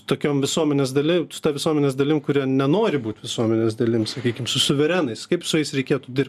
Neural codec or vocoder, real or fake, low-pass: none; real; 14.4 kHz